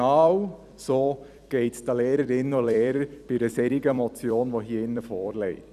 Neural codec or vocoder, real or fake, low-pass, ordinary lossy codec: vocoder, 44.1 kHz, 128 mel bands every 256 samples, BigVGAN v2; fake; 14.4 kHz; none